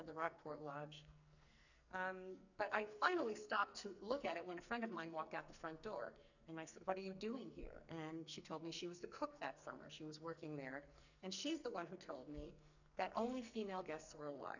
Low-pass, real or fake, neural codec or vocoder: 7.2 kHz; fake; codec, 44.1 kHz, 2.6 kbps, SNAC